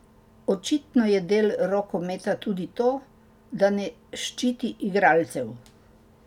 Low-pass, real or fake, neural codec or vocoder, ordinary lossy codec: 19.8 kHz; real; none; none